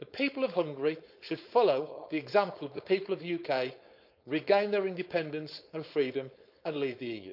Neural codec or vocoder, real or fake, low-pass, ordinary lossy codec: codec, 16 kHz, 4.8 kbps, FACodec; fake; 5.4 kHz; none